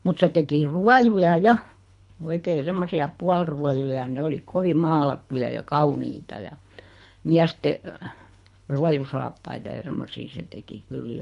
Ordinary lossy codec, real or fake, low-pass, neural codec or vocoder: MP3, 64 kbps; fake; 10.8 kHz; codec, 24 kHz, 3 kbps, HILCodec